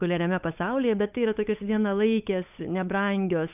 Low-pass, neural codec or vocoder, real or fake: 3.6 kHz; codec, 16 kHz, 8 kbps, FunCodec, trained on Chinese and English, 25 frames a second; fake